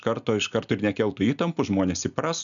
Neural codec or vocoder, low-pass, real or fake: none; 7.2 kHz; real